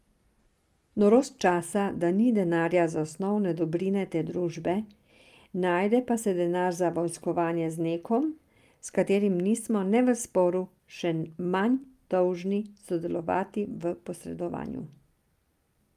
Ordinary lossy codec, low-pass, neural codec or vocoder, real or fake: Opus, 32 kbps; 14.4 kHz; none; real